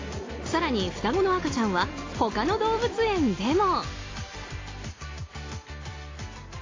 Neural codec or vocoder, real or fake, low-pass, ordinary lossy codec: none; real; 7.2 kHz; AAC, 32 kbps